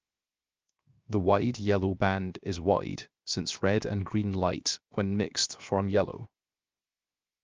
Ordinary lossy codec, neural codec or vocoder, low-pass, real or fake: Opus, 24 kbps; codec, 16 kHz, 0.7 kbps, FocalCodec; 7.2 kHz; fake